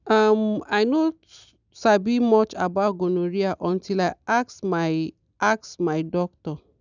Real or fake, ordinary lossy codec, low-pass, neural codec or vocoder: real; none; 7.2 kHz; none